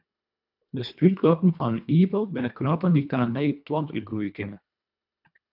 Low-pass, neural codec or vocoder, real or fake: 5.4 kHz; codec, 24 kHz, 1.5 kbps, HILCodec; fake